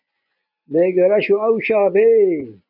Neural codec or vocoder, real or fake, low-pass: vocoder, 24 kHz, 100 mel bands, Vocos; fake; 5.4 kHz